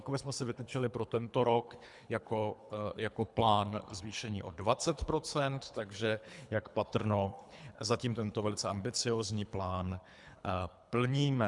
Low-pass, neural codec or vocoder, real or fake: 10.8 kHz; codec, 24 kHz, 3 kbps, HILCodec; fake